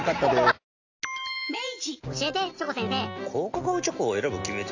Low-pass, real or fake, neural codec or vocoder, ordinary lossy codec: 7.2 kHz; real; none; AAC, 48 kbps